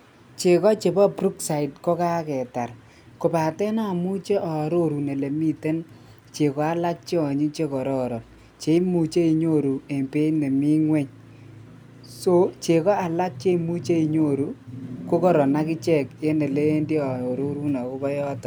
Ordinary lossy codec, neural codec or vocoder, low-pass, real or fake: none; none; none; real